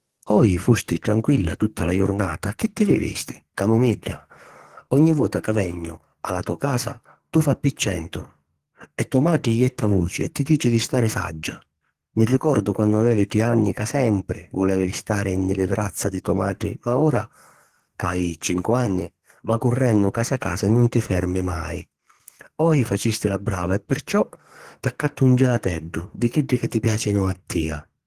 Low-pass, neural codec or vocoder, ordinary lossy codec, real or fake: 14.4 kHz; codec, 44.1 kHz, 2.6 kbps, SNAC; Opus, 16 kbps; fake